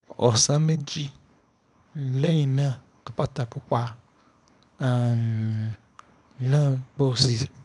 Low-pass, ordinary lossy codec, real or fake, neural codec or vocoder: 10.8 kHz; none; fake; codec, 24 kHz, 0.9 kbps, WavTokenizer, small release